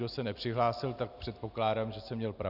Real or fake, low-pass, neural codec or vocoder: real; 5.4 kHz; none